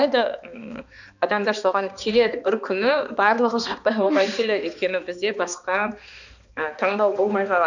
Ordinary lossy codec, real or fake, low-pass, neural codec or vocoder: none; fake; 7.2 kHz; codec, 16 kHz, 2 kbps, X-Codec, HuBERT features, trained on balanced general audio